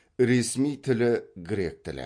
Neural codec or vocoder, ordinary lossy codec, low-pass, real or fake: none; MP3, 48 kbps; 9.9 kHz; real